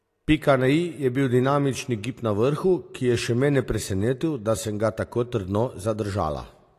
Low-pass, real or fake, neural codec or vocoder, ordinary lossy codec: 14.4 kHz; real; none; AAC, 48 kbps